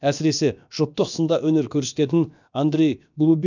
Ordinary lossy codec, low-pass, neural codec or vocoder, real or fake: none; 7.2 kHz; codec, 16 kHz, about 1 kbps, DyCAST, with the encoder's durations; fake